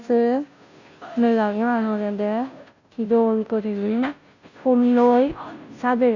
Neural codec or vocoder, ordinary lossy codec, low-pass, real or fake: codec, 16 kHz, 0.5 kbps, FunCodec, trained on Chinese and English, 25 frames a second; none; 7.2 kHz; fake